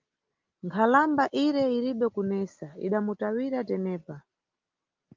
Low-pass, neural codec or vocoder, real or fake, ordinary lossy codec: 7.2 kHz; none; real; Opus, 32 kbps